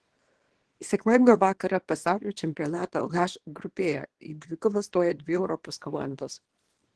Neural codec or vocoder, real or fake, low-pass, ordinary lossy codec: codec, 24 kHz, 0.9 kbps, WavTokenizer, small release; fake; 10.8 kHz; Opus, 16 kbps